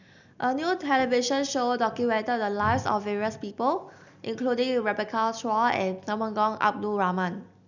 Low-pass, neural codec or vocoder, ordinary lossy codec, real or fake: 7.2 kHz; none; none; real